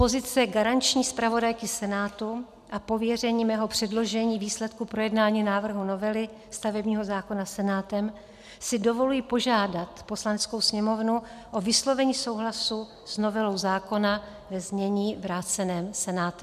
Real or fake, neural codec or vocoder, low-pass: real; none; 14.4 kHz